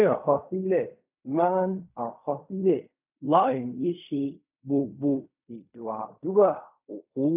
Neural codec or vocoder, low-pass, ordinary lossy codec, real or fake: codec, 16 kHz in and 24 kHz out, 0.4 kbps, LongCat-Audio-Codec, fine tuned four codebook decoder; 3.6 kHz; none; fake